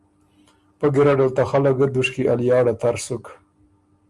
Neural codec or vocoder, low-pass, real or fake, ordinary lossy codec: none; 10.8 kHz; real; Opus, 32 kbps